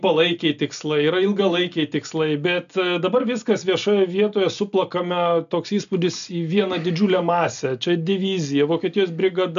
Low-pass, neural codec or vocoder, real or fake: 7.2 kHz; none; real